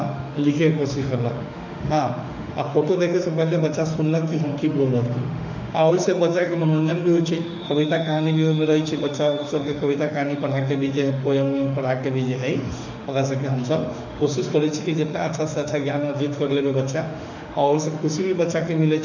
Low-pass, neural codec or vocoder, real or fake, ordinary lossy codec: 7.2 kHz; autoencoder, 48 kHz, 32 numbers a frame, DAC-VAE, trained on Japanese speech; fake; none